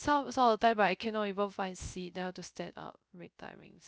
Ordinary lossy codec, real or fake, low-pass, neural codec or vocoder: none; fake; none; codec, 16 kHz, 0.3 kbps, FocalCodec